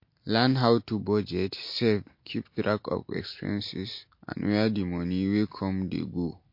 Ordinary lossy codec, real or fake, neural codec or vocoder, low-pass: MP3, 32 kbps; real; none; 5.4 kHz